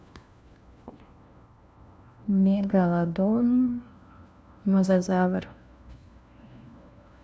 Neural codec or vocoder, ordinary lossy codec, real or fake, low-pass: codec, 16 kHz, 1 kbps, FunCodec, trained on LibriTTS, 50 frames a second; none; fake; none